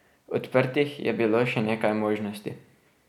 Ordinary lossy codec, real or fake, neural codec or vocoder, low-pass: none; real; none; 19.8 kHz